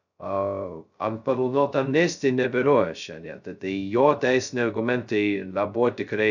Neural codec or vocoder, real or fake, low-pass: codec, 16 kHz, 0.2 kbps, FocalCodec; fake; 7.2 kHz